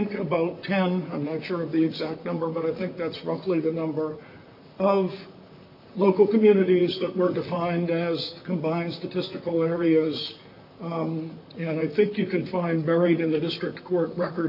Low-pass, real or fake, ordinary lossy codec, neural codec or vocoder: 5.4 kHz; fake; MP3, 48 kbps; vocoder, 44.1 kHz, 128 mel bands, Pupu-Vocoder